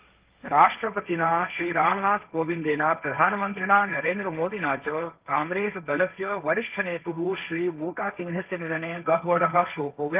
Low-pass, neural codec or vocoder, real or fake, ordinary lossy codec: 3.6 kHz; codec, 16 kHz, 1.1 kbps, Voila-Tokenizer; fake; Opus, 24 kbps